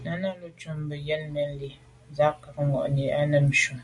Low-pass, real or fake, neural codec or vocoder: 10.8 kHz; real; none